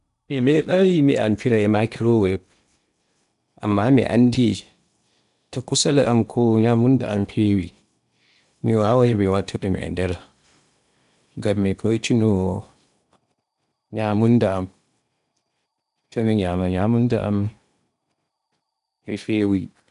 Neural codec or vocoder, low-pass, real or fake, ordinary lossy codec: codec, 16 kHz in and 24 kHz out, 0.8 kbps, FocalCodec, streaming, 65536 codes; 10.8 kHz; fake; none